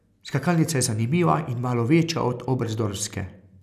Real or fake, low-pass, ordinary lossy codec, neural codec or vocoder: real; 14.4 kHz; none; none